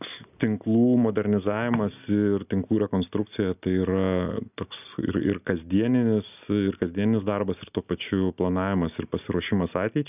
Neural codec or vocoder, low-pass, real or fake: none; 3.6 kHz; real